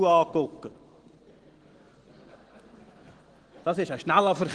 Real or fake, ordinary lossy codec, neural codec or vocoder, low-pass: real; Opus, 16 kbps; none; 9.9 kHz